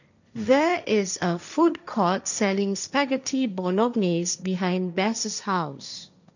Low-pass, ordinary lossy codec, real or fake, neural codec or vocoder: 7.2 kHz; none; fake; codec, 16 kHz, 1.1 kbps, Voila-Tokenizer